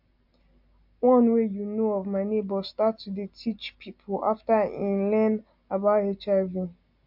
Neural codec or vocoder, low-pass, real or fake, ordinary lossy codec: none; 5.4 kHz; real; MP3, 48 kbps